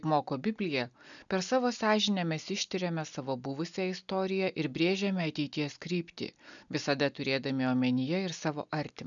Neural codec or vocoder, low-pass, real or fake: none; 7.2 kHz; real